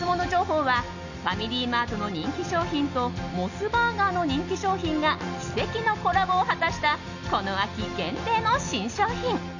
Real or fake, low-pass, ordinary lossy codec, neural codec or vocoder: real; 7.2 kHz; none; none